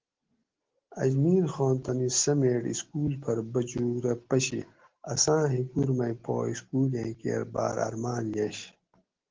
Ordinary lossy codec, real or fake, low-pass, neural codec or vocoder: Opus, 16 kbps; real; 7.2 kHz; none